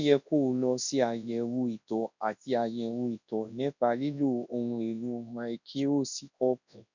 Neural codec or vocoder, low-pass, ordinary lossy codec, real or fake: codec, 24 kHz, 0.9 kbps, WavTokenizer, large speech release; 7.2 kHz; none; fake